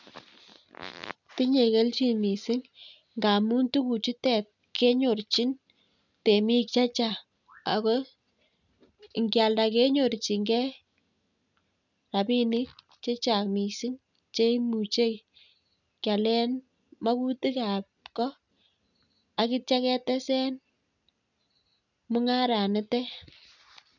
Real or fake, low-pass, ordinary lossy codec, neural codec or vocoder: real; 7.2 kHz; none; none